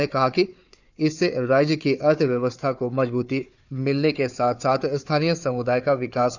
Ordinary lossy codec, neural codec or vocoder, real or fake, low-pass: none; codec, 16 kHz, 4 kbps, FunCodec, trained on Chinese and English, 50 frames a second; fake; 7.2 kHz